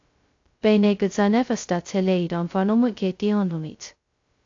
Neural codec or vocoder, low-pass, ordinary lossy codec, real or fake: codec, 16 kHz, 0.2 kbps, FocalCodec; 7.2 kHz; AAC, 48 kbps; fake